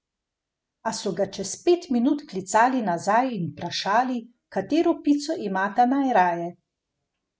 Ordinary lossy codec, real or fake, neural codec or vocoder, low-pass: none; real; none; none